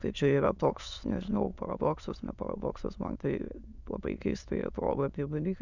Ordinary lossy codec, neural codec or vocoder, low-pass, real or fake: Opus, 64 kbps; autoencoder, 22.05 kHz, a latent of 192 numbers a frame, VITS, trained on many speakers; 7.2 kHz; fake